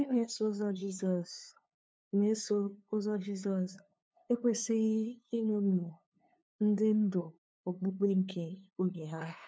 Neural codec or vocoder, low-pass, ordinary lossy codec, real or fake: codec, 16 kHz, 2 kbps, FunCodec, trained on LibriTTS, 25 frames a second; none; none; fake